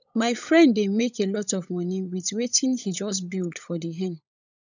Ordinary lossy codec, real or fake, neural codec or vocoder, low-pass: none; fake; codec, 16 kHz, 16 kbps, FunCodec, trained on LibriTTS, 50 frames a second; 7.2 kHz